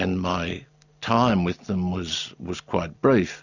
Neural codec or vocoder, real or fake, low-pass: none; real; 7.2 kHz